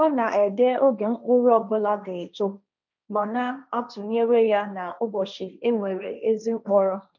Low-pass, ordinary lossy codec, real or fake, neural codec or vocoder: 7.2 kHz; none; fake; codec, 16 kHz, 1.1 kbps, Voila-Tokenizer